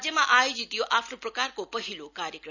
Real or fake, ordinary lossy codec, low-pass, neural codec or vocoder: real; none; 7.2 kHz; none